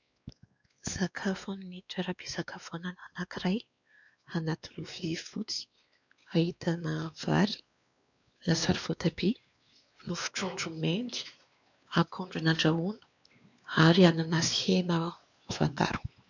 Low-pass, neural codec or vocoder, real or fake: 7.2 kHz; codec, 16 kHz, 2 kbps, X-Codec, WavLM features, trained on Multilingual LibriSpeech; fake